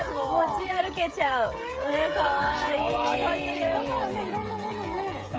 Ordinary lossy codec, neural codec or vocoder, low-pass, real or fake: none; codec, 16 kHz, 16 kbps, FreqCodec, smaller model; none; fake